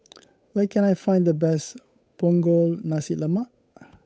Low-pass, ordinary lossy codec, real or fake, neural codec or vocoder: none; none; fake; codec, 16 kHz, 8 kbps, FunCodec, trained on Chinese and English, 25 frames a second